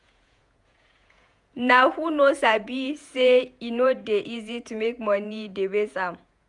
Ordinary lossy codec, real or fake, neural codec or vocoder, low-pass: none; fake; vocoder, 48 kHz, 128 mel bands, Vocos; 10.8 kHz